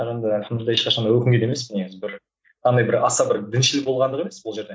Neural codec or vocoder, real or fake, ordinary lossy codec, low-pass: none; real; none; none